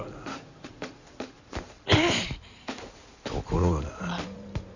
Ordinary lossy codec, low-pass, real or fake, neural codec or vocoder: none; 7.2 kHz; fake; codec, 16 kHz in and 24 kHz out, 2.2 kbps, FireRedTTS-2 codec